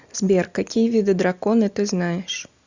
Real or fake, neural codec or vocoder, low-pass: real; none; 7.2 kHz